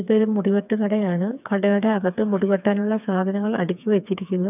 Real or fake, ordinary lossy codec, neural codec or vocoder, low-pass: fake; none; codec, 16 kHz, 4 kbps, FreqCodec, smaller model; 3.6 kHz